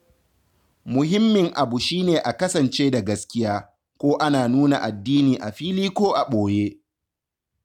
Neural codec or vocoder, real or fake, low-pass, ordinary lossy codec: none; real; 19.8 kHz; none